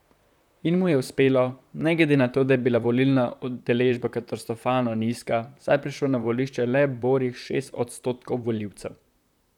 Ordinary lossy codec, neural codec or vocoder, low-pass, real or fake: none; vocoder, 44.1 kHz, 128 mel bands, Pupu-Vocoder; 19.8 kHz; fake